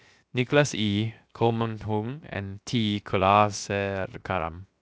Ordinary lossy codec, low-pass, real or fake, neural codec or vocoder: none; none; fake; codec, 16 kHz, 0.7 kbps, FocalCodec